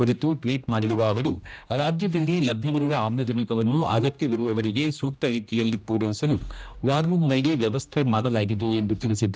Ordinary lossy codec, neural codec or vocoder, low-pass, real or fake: none; codec, 16 kHz, 1 kbps, X-Codec, HuBERT features, trained on general audio; none; fake